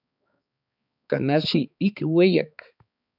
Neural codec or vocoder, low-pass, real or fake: codec, 16 kHz, 4 kbps, X-Codec, HuBERT features, trained on balanced general audio; 5.4 kHz; fake